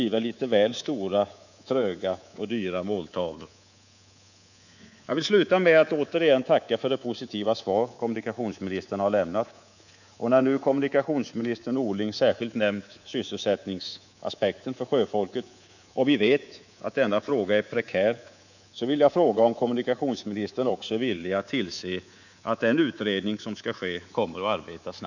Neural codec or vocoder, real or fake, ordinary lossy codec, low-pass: codec, 24 kHz, 3.1 kbps, DualCodec; fake; none; 7.2 kHz